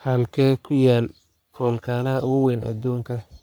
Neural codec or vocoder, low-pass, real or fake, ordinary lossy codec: codec, 44.1 kHz, 3.4 kbps, Pupu-Codec; none; fake; none